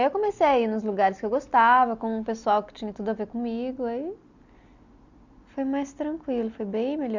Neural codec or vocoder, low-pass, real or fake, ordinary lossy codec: none; 7.2 kHz; real; none